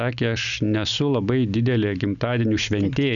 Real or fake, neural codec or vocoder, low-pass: real; none; 7.2 kHz